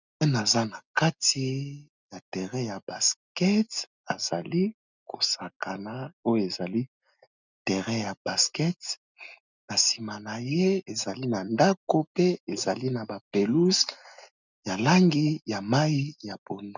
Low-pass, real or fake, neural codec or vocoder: 7.2 kHz; real; none